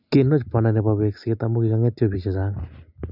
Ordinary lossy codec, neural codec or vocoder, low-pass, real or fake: none; none; 5.4 kHz; real